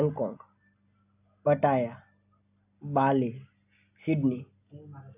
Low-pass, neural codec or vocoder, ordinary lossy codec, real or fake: 3.6 kHz; none; AAC, 32 kbps; real